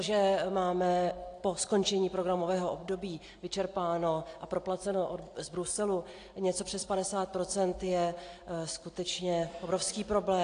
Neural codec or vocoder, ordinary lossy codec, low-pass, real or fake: none; AAC, 48 kbps; 9.9 kHz; real